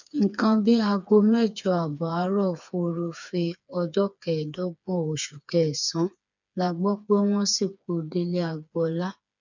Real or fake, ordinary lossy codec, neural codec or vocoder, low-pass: fake; none; codec, 16 kHz, 4 kbps, FreqCodec, smaller model; 7.2 kHz